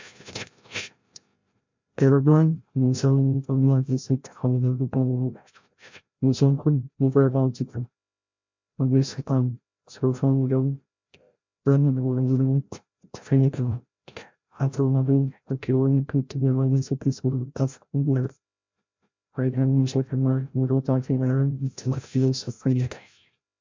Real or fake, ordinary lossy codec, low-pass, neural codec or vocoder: fake; MP3, 64 kbps; 7.2 kHz; codec, 16 kHz, 0.5 kbps, FreqCodec, larger model